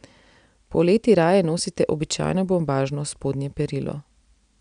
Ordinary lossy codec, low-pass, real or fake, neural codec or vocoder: none; 9.9 kHz; real; none